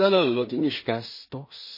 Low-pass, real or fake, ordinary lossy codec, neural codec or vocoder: 5.4 kHz; fake; MP3, 24 kbps; codec, 16 kHz in and 24 kHz out, 0.4 kbps, LongCat-Audio-Codec, two codebook decoder